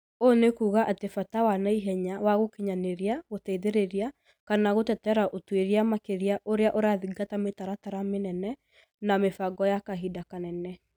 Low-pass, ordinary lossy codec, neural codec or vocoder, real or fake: none; none; none; real